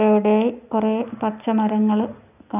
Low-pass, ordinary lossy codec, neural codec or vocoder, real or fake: 3.6 kHz; none; none; real